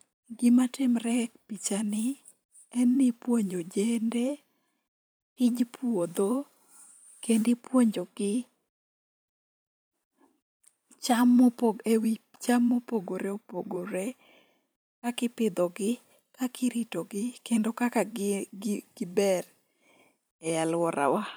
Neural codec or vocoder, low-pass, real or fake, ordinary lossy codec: vocoder, 44.1 kHz, 128 mel bands every 512 samples, BigVGAN v2; none; fake; none